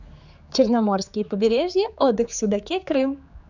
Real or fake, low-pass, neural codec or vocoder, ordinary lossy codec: fake; 7.2 kHz; codec, 16 kHz, 4 kbps, X-Codec, HuBERT features, trained on general audio; none